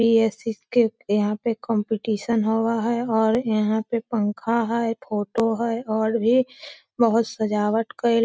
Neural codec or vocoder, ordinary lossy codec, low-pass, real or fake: none; none; none; real